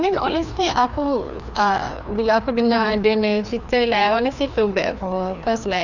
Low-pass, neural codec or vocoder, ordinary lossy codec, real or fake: 7.2 kHz; codec, 16 kHz, 2 kbps, FreqCodec, larger model; none; fake